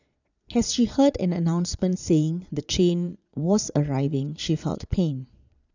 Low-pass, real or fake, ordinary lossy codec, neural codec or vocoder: 7.2 kHz; fake; none; vocoder, 22.05 kHz, 80 mel bands, Vocos